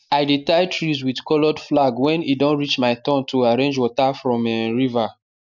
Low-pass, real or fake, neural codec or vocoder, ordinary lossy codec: 7.2 kHz; real; none; none